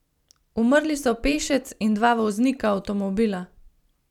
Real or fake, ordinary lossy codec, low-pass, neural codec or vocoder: fake; none; 19.8 kHz; vocoder, 44.1 kHz, 128 mel bands every 256 samples, BigVGAN v2